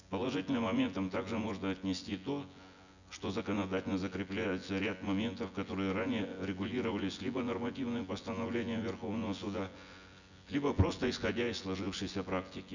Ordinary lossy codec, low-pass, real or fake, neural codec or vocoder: none; 7.2 kHz; fake; vocoder, 24 kHz, 100 mel bands, Vocos